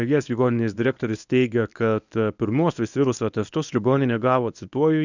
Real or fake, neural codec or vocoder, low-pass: fake; codec, 24 kHz, 0.9 kbps, WavTokenizer, medium speech release version 1; 7.2 kHz